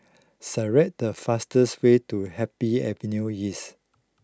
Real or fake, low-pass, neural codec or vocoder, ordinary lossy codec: real; none; none; none